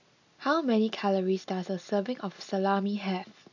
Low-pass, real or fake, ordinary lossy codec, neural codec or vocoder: 7.2 kHz; real; none; none